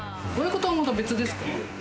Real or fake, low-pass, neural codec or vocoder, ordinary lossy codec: real; none; none; none